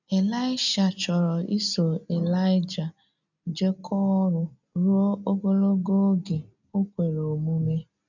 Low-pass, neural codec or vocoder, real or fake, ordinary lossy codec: 7.2 kHz; none; real; none